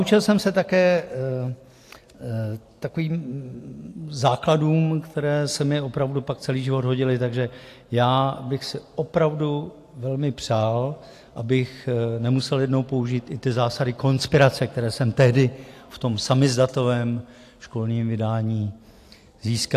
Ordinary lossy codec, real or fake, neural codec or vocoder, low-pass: AAC, 64 kbps; real; none; 14.4 kHz